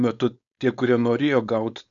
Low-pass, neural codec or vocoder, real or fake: 7.2 kHz; codec, 16 kHz, 4.8 kbps, FACodec; fake